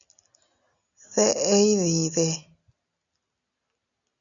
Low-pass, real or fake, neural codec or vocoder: 7.2 kHz; real; none